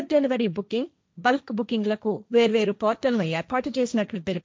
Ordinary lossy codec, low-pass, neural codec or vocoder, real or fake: none; none; codec, 16 kHz, 1.1 kbps, Voila-Tokenizer; fake